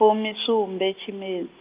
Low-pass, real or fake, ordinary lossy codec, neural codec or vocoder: 3.6 kHz; real; Opus, 32 kbps; none